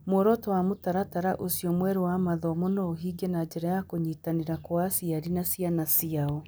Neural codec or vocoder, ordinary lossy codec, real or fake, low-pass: none; none; real; none